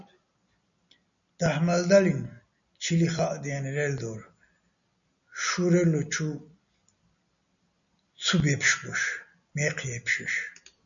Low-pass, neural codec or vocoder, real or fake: 7.2 kHz; none; real